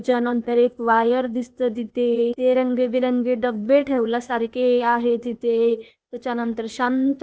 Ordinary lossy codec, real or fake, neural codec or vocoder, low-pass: none; fake; codec, 16 kHz, 0.8 kbps, ZipCodec; none